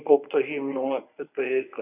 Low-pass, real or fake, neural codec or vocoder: 3.6 kHz; fake; codec, 24 kHz, 0.9 kbps, WavTokenizer, medium speech release version 1